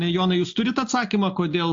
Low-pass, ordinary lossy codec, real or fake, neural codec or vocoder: 7.2 kHz; AAC, 48 kbps; real; none